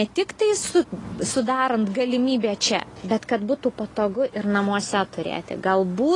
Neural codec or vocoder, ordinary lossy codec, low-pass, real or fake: none; AAC, 32 kbps; 10.8 kHz; real